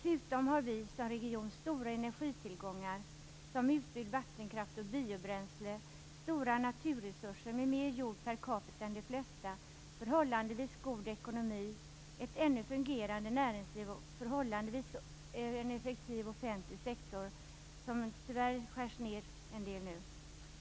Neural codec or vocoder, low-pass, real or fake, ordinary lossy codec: none; none; real; none